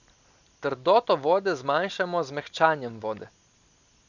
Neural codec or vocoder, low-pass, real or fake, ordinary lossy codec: none; 7.2 kHz; real; none